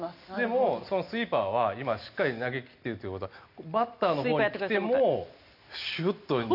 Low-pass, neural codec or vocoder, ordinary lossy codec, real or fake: 5.4 kHz; none; none; real